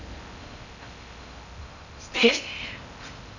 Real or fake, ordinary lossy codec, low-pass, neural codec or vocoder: fake; none; 7.2 kHz; codec, 16 kHz in and 24 kHz out, 0.6 kbps, FocalCodec, streaming, 4096 codes